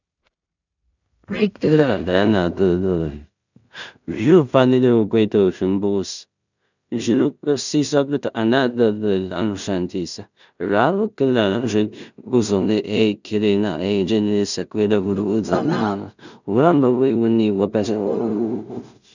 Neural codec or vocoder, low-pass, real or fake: codec, 16 kHz in and 24 kHz out, 0.4 kbps, LongCat-Audio-Codec, two codebook decoder; 7.2 kHz; fake